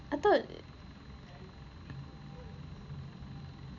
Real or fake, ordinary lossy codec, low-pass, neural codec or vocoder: real; none; 7.2 kHz; none